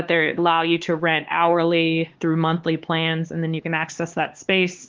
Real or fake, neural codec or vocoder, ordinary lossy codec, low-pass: fake; codec, 16 kHz, 4 kbps, X-Codec, HuBERT features, trained on LibriSpeech; Opus, 16 kbps; 7.2 kHz